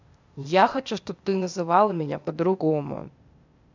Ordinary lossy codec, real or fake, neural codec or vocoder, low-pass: MP3, 64 kbps; fake; codec, 16 kHz, 0.8 kbps, ZipCodec; 7.2 kHz